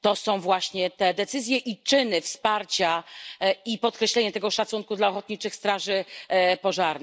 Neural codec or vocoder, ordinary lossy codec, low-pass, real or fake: none; none; none; real